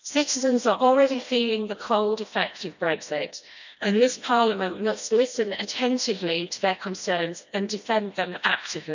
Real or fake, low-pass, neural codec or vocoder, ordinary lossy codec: fake; 7.2 kHz; codec, 16 kHz, 1 kbps, FreqCodec, smaller model; none